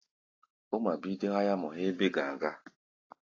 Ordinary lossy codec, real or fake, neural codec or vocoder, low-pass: AAC, 32 kbps; real; none; 7.2 kHz